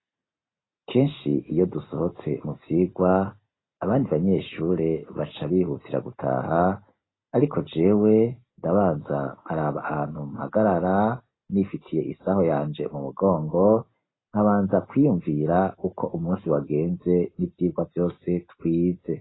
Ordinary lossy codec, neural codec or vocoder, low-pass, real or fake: AAC, 16 kbps; none; 7.2 kHz; real